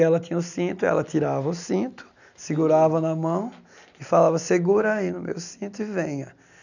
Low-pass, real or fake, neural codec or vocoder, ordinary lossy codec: 7.2 kHz; real; none; none